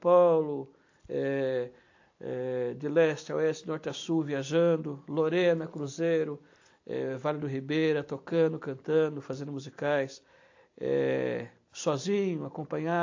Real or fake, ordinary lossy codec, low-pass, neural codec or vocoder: fake; AAC, 48 kbps; 7.2 kHz; vocoder, 44.1 kHz, 128 mel bands every 256 samples, BigVGAN v2